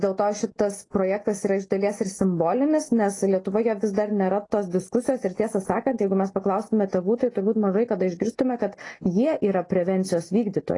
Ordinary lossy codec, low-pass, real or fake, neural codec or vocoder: AAC, 32 kbps; 10.8 kHz; real; none